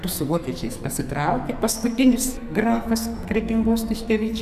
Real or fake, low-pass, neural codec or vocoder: fake; 14.4 kHz; codec, 44.1 kHz, 2.6 kbps, SNAC